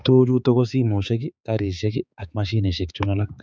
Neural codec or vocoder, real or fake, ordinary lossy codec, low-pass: codec, 16 kHz, 4 kbps, X-Codec, HuBERT features, trained on balanced general audio; fake; none; none